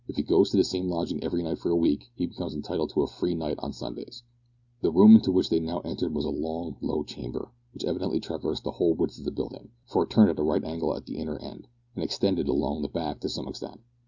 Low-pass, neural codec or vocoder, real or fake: 7.2 kHz; none; real